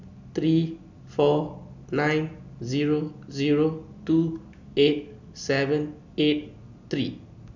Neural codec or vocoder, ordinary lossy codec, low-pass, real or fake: none; none; 7.2 kHz; real